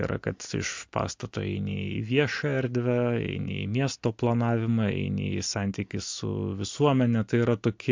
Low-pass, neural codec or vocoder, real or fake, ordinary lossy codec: 7.2 kHz; none; real; MP3, 64 kbps